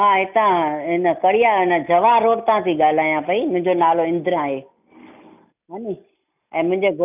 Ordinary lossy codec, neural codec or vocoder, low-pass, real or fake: none; none; 3.6 kHz; real